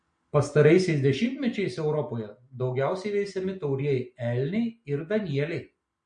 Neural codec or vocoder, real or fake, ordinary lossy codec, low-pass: none; real; MP3, 48 kbps; 9.9 kHz